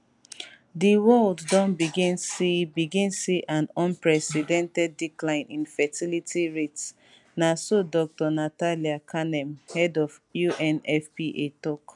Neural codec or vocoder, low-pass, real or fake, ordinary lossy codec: none; 10.8 kHz; real; none